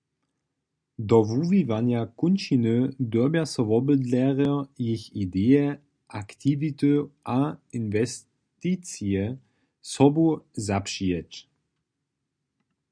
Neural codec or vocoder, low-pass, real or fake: none; 9.9 kHz; real